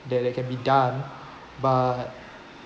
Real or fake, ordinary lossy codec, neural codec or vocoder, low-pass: real; none; none; none